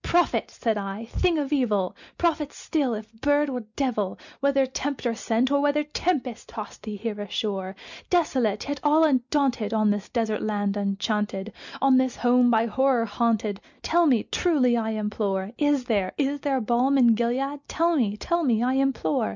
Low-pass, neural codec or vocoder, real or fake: 7.2 kHz; none; real